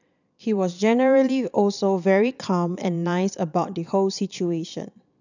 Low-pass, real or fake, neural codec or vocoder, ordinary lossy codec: 7.2 kHz; fake; vocoder, 22.05 kHz, 80 mel bands, Vocos; none